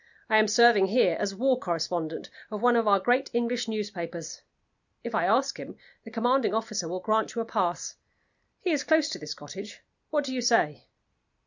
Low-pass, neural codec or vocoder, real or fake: 7.2 kHz; none; real